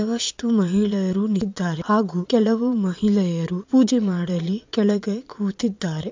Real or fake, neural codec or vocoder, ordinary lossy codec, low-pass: fake; vocoder, 22.05 kHz, 80 mel bands, Vocos; MP3, 64 kbps; 7.2 kHz